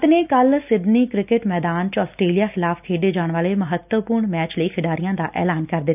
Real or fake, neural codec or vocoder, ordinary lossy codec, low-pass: real; none; none; 3.6 kHz